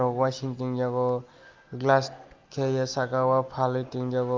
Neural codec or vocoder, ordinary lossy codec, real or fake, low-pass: none; Opus, 24 kbps; real; 7.2 kHz